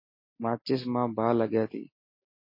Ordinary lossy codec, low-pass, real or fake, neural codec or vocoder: MP3, 24 kbps; 5.4 kHz; real; none